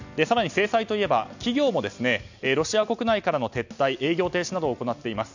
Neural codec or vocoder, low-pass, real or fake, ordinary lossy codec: none; 7.2 kHz; real; none